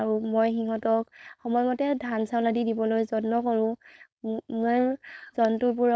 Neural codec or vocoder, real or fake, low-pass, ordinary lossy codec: codec, 16 kHz, 4.8 kbps, FACodec; fake; none; none